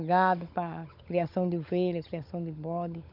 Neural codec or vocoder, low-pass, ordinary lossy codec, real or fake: codec, 16 kHz, 16 kbps, FunCodec, trained on LibriTTS, 50 frames a second; 5.4 kHz; none; fake